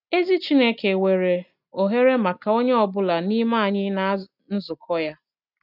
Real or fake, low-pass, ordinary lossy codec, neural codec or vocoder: real; 5.4 kHz; none; none